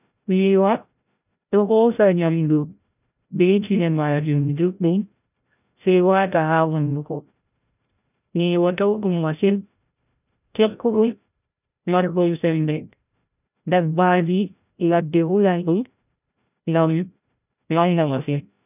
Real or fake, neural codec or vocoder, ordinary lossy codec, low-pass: fake; codec, 16 kHz, 0.5 kbps, FreqCodec, larger model; none; 3.6 kHz